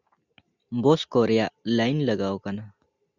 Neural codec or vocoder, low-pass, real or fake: none; 7.2 kHz; real